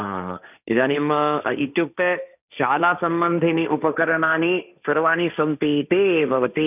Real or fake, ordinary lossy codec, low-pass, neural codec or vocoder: fake; none; 3.6 kHz; codec, 16 kHz, 1.1 kbps, Voila-Tokenizer